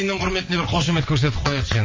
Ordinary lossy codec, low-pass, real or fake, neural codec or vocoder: MP3, 32 kbps; 7.2 kHz; fake; vocoder, 22.05 kHz, 80 mel bands, WaveNeXt